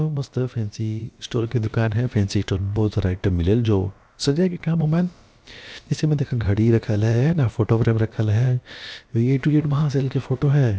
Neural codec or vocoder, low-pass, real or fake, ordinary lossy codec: codec, 16 kHz, about 1 kbps, DyCAST, with the encoder's durations; none; fake; none